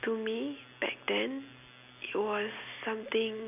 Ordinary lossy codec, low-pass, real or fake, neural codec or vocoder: none; 3.6 kHz; real; none